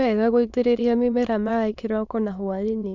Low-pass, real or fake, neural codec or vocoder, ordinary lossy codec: 7.2 kHz; fake; autoencoder, 22.05 kHz, a latent of 192 numbers a frame, VITS, trained on many speakers; none